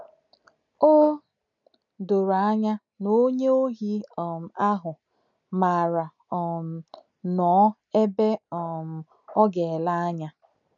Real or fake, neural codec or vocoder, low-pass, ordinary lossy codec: real; none; 7.2 kHz; none